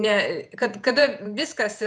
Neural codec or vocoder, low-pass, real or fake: vocoder, 48 kHz, 128 mel bands, Vocos; 9.9 kHz; fake